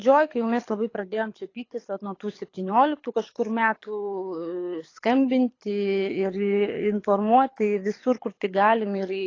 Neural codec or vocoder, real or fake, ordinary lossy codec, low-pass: codec, 24 kHz, 6 kbps, HILCodec; fake; AAC, 32 kbps; 7.2 kHz